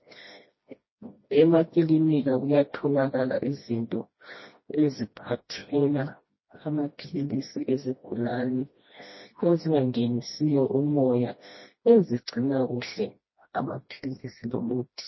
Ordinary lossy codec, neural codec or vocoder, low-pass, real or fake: MP3, 24 kbps; codec, 16 kHz, 1 kbps, FreqCodec, smaller model; 7.2 kHz; fake